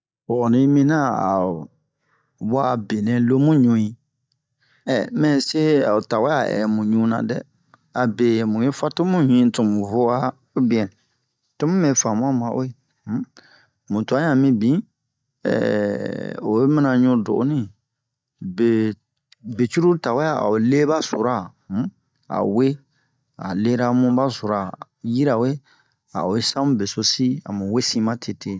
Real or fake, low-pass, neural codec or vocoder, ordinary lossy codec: real; none; none; none